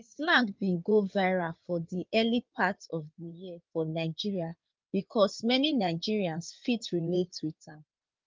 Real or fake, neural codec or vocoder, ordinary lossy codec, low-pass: fake; codec, 16 kHz in and 24 kHz out, 2.2 kbps, FireRedTTS-2 codec; Opus, 24 kbps; 7.2 kHz